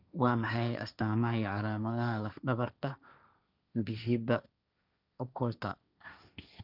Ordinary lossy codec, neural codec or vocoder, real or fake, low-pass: none; codec, 16 kHz, 1.1 kbps, Voila-Tokenizer; fake; 5.4 kHz